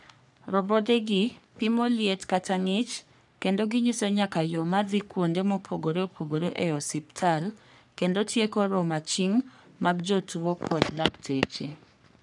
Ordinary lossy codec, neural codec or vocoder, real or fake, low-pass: none; codec, 44.1 kHz, 3.4 kbps, Pupu-Codec; fake; 10.8 kHz